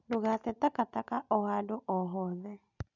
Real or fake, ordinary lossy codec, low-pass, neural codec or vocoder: real; none; 7.2 kHz; none